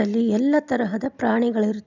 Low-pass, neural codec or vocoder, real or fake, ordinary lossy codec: 7.2 kHz; none; real; none